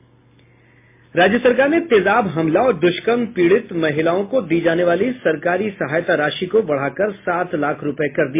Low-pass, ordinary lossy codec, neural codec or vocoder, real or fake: 3.6 kHz; MP3, 24 kbps; none; real